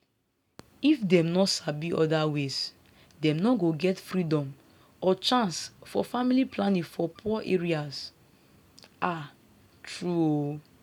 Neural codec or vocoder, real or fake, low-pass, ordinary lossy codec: none; real; 19.8 kHz; none